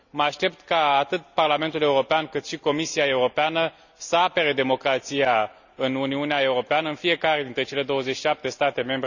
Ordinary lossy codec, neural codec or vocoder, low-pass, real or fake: none; none; 7.2 kHz; real